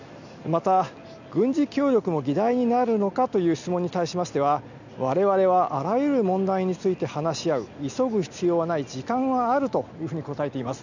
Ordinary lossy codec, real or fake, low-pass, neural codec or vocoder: none; real; 7.2 kHz; none